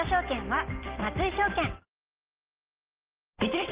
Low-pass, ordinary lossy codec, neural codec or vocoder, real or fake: 3.6 kHz; Opus, 16 kbps; none; real